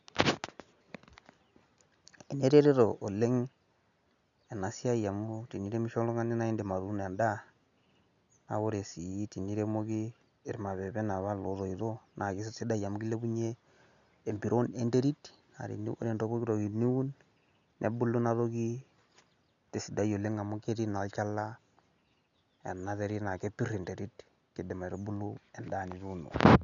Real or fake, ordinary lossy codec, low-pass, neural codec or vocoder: real; none; 7.2 kHz; none